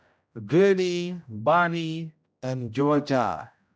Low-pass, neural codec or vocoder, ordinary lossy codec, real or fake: none; codec, 16 kHz, 0.5 kbps, X-Codec, HuBERT features, trained on general audio; none; fake